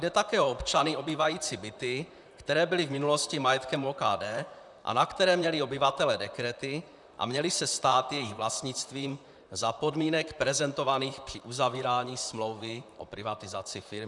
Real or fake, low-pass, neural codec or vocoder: fake; 10.8 kHz; vocoder, 44.1 kHz, 128 mel bands, Pupu-Vocoder